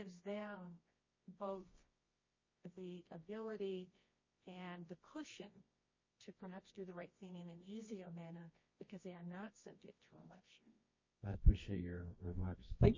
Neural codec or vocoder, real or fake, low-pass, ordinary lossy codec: codec, 24 kHz, 0.9 kbps, WavTokenizer, medium music audio release; fake; 7.2 kHz; MP3, 32 kbps